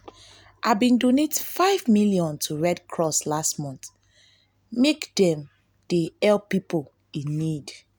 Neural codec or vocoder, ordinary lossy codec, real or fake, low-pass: none; none; real; none